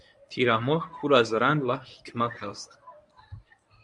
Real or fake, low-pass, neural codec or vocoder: fake; 10.8 kHz; codec, 24 kHz, 0.9 kbps, WavTokenizer, medium speech release version 1